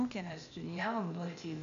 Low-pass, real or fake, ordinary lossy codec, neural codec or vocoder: 7.2 kHz; fake; AAC, 96 kbps; codec, 16 kHz, 0.8 kbps, ZipCodec